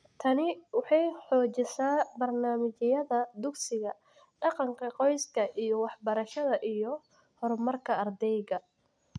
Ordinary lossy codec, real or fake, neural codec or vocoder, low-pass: none; real; none; 9.9 kHz